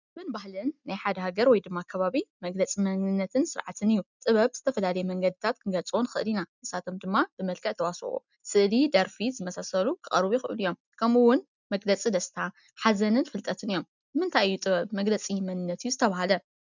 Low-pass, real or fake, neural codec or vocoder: 7.2 kHz; real; none